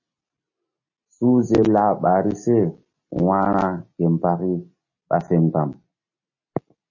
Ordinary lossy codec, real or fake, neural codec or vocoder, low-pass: MP3, 32 kbps; real; none; 7.2 kHz